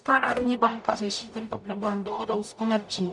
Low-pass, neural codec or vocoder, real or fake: 10.8 kHz; codec, 44.1 kHz, 0.9 kbps, DAC; fake